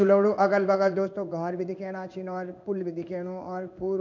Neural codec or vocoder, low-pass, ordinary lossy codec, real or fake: codec, 16 kHz in and 24 kHz out, 1 kbps, XY-Tokenizer; 7.2 kHz; none; fake